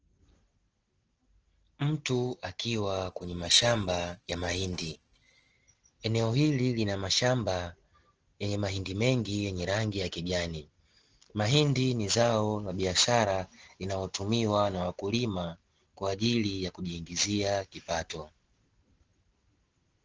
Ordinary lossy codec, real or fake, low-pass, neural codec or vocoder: Opus, 16 kbps; real; 7.2 kHz; none